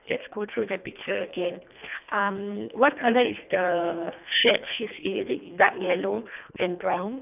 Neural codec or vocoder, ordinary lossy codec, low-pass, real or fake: codec, 24 kHz, 1.5 kbps, HILCodec; none; 3.6 kHz; fake